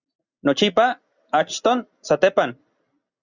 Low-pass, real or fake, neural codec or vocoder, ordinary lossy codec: 7.2 kHz; real; none; Opus, 64 kbps